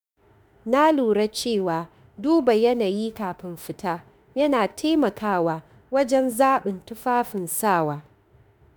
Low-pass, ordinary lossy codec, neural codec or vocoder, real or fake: none; none; autoencoder, 48 kHz, 32 numbers a frame, DAC-VAE, trained on Japanese speech; fake